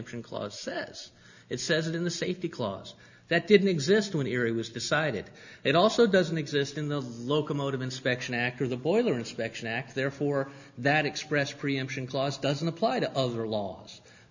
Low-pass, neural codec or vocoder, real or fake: 7.2 kHz; none; real